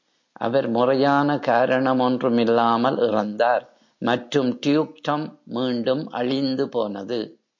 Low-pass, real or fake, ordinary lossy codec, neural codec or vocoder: 7.2 kHz; real; MP3, 48 kbps; none